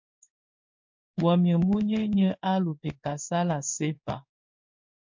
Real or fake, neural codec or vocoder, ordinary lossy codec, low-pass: fake; codec, 16 kHz in and 24 kHz out, 1 kbps, XY-Tokenizer; MP3, 48 kbps; 7.2 kHz